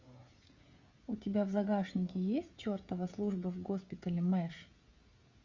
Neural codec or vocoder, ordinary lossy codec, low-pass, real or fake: codec, 16 kHz, 16 kbps, FreqCodec, smaller model; Opus, 64 kbps; 7.2 kHz; fake